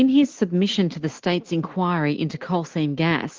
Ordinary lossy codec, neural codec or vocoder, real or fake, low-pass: Opus, 16 kbps; none; real; 7.2 kHz